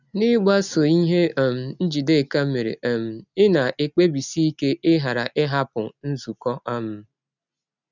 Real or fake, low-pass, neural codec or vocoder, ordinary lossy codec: real; 7.2 kHz; none; none